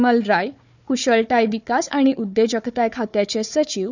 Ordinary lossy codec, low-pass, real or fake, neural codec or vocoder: none; 7.2 kHz; fake; codec, 16 kHz, 4 kbps, FunCodec, trained on Chinese and English, 50 frames a second